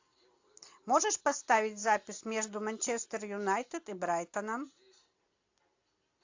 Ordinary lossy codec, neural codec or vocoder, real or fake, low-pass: AAC, 48 kbps; none; real; 7.2 kHz